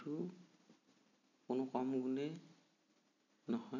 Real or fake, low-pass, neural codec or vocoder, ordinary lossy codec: real; 7.2 kHz; none; none